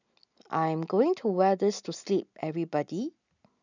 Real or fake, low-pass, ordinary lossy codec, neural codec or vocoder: real; 7.2 kHz; none; none